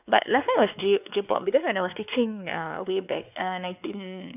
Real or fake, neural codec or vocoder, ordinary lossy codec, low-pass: fake; codec, 16 kHz, 2 kbps, X-Codec, WavLM features, trained on Multilingual LibriSpeech; none; 3.6 kHz